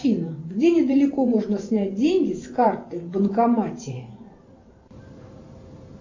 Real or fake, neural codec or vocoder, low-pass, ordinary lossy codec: fake; vocoder, 44.1 kHz, 128 mel bands every 512 samples, BigVGAN v2; 7.2 kHz; AAC, 48 kbps